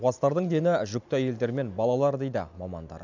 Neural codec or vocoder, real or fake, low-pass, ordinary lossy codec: none; real; 7.2 kHz; none